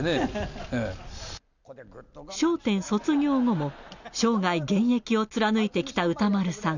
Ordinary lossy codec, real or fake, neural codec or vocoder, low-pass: none; real; none; 7.2 kHz